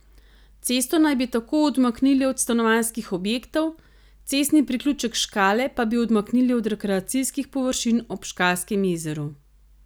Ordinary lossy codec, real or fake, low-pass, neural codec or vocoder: none; real; none; none